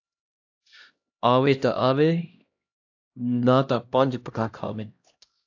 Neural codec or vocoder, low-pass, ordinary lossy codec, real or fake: codec, 16 kHz, 1 kbps, X-Codec, HuBERT features, trained on LibriSpeech; 7.2 kHz; AAC, 48 kbps; fake